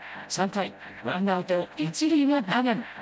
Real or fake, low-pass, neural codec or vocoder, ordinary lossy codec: fake; none; codec, 16 kHz, 0.5 kbps, FreqCodec, smaller model; none